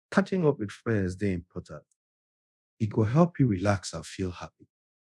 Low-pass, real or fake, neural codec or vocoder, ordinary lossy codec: none; fake; codec, 24 kHz, 0.5 kbps, DualCodec; none